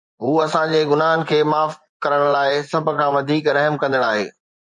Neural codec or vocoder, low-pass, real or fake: vocoder, 24 kHz, 100 mel bands, Vocos; 10.8 kHz; fake